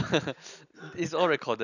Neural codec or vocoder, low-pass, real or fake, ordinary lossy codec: none; 7.2 kHz; real; none